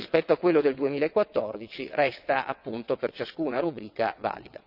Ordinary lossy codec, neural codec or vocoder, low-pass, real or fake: none; vocoder, 22.05 kHz, 80 mel bands, WaveNeXt; 5.4 kHz; fake